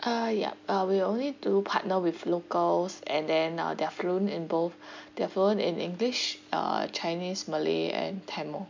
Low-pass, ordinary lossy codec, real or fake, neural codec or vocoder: 7.2 kHz; MP3, 64 kbps; real; none